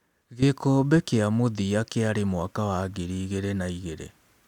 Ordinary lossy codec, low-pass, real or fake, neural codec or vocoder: none; 19.8 kHz; real; none